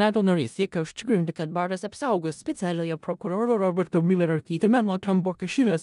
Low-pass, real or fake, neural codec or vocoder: 10.8 kHz; fake; codec, 16 kHz in and 24 kHz out, 0.4 kbps, LongCat-Audio-Codec, four codebook decoder